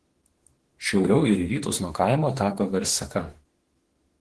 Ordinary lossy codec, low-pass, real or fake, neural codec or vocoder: Opus, 16 kbps; 10.8 kHz; fake; autoencoder, 48 kHz, 32 numbers a frame, DAC-VAE, trained on Japanese speech